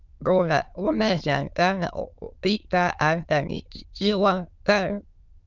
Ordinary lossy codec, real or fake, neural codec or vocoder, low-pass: Opus, 24 kbps; fake; autoencoder, 22.05 kHz, a latent of 192 numbers a frame, VITS, trained on many speakers; 7.2 kHz